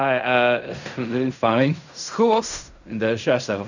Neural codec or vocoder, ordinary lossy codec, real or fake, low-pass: codec, 16 kHz in and 24 kHz out, 0.4 kbps, LongCat-Audio-Codec, fine tuned four codebook decoder; none; fake; 7.2 kHz